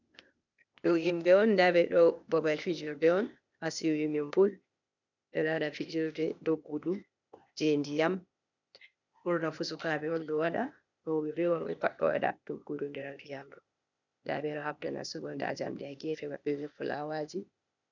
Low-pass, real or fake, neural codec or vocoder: 7.2 kHz; fake; codec, 16 kHz, 0.8 kbps, ZipCodec